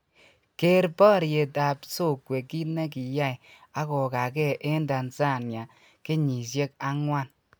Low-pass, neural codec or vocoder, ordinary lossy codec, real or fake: none; none; none; real